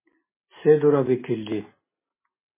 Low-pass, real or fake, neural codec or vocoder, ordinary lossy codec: 3.6 kHz; real; none; MP3, 16 kbps